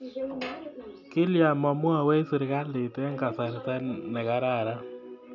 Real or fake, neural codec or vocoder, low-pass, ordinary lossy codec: real; none; 7.2 kHz; none